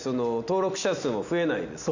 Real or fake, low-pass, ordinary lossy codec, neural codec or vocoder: real; 7.2 kHz; none; none